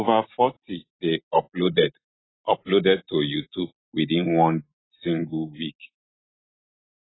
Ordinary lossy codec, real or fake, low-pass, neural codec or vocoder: AAC, 16 kbps; real; 7.2 kHz; none